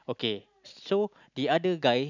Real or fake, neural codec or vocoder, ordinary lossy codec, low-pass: real; none; none; 7.2 kHz